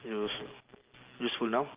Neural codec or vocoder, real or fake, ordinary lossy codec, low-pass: none; real; Opus, 32 kbps; 3.6 kHz